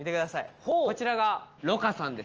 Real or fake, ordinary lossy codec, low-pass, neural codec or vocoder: real; Opus, 24 kbps; 7.2 kHz; none